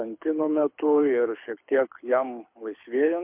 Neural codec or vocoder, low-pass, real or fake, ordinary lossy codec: codec, 24 kHz, 6 kbps, HILCodec; 3.6 kHz; fake; MP3, 32 kbps